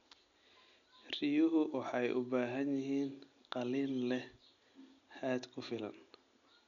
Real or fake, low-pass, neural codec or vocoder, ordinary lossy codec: real; 7.2 kHz; none; none